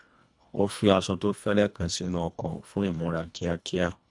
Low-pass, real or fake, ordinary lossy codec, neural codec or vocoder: 10.8 kHz; fake; none; codec, 24 kHz, 1.5 kbps, HILCodec